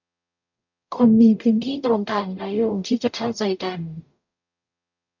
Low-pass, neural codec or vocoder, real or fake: 7.2 kHz; codec, 44.1 kHz, 0.9 kbps, DAC; fake